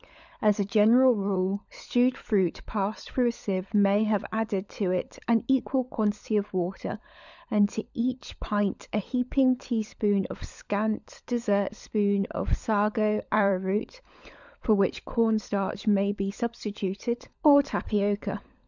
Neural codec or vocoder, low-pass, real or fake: codec, 16 kHz, 16 kbps, FunCodec, trained on LibriTTS, 50 frames a second; 7.2 kHz; fake